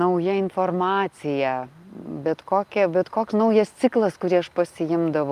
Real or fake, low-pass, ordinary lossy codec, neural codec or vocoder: real; 14.4 kHz; Opus, 32 kbps; none